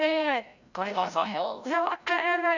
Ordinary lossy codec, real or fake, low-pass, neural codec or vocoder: none; fake; 7.2 kHz; codec, 16 kHz, 0.5 kbps, FreqCodec, larger model